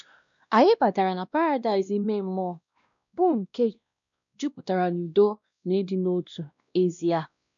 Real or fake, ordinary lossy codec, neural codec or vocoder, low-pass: fake; none; codec, 16 kHz, 1 kbps, X-Codec, WavLM features, trained on Multilingual LibriSpeech; 7.2 kHz